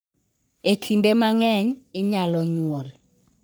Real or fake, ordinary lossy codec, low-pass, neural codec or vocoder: fake; none; none; codec, 44.1 kHz, 3.4 kbps, Pupu-Codec